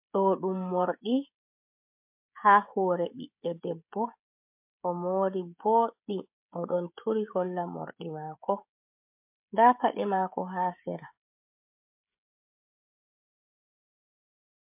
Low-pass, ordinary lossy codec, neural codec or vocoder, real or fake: 3.6 kHz; MP3, 32 kbps; codec, 16 kHz, 16 kbps, FreqCodec, smaller model; fake